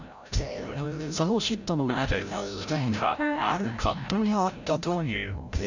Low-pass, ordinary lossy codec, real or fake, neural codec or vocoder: 7.2 kHz; none; fake; codec, 16 kHz, 0.5 kbps, FreqCodec, larger model